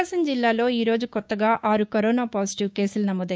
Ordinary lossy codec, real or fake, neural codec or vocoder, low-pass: none; fake; codec, 16 kHz, 6 kbps, DAC; none